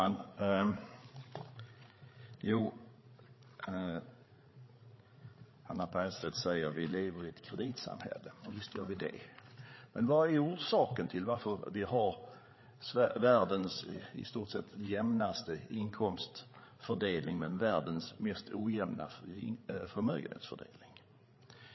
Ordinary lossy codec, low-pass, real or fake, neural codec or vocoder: MP3, 24 kbps; 7.2 kHz; fake; codec, 16 kHz, 8 kbps, FreqCodec, larger model